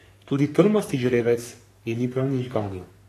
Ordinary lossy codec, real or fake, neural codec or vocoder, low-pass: AAC, 64 kbps; fake; codec, 44.1 kHz, 3.4 kbps, Pupu-Codec; 14.4 kHz